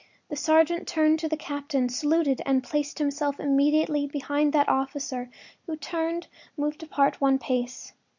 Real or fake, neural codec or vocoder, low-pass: real; none; 7.2 kHz